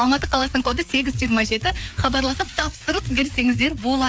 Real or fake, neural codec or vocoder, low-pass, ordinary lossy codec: fake; codec, 16 kHz, 4 kbps, FreqCodec, larger model; none; none